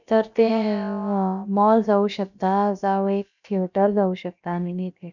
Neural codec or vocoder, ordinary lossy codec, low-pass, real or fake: codec, 16 kHz, about 1 kbps, DyCAST, with the encoder's durations; none; 7.2 kHz; fake